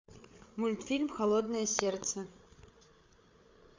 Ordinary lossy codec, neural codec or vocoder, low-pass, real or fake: MP3, 64 kbps; codec, 16 kHz, 8 kbps, FreqCodec, larger model; 7.2 kHz; fake